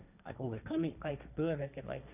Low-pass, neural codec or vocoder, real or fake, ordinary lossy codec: 3.6 kHz; codec, 16 kHz, 1.1 kbps, Voila-Tokenizer; fake; none